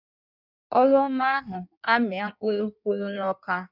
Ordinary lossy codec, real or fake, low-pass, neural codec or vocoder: none; fake; 5.4 kHz; codec, 16 kHz in and 24 kHz out, 1.1 kbps, FireRedTTS-2 codec